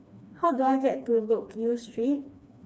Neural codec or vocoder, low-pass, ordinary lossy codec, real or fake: codec, 16 kHz, 2 kbps, FreqCodec, smaller model; none; none; fake